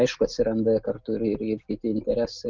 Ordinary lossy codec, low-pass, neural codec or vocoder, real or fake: Opus, 32 kbps; 7.2 kHz; none; real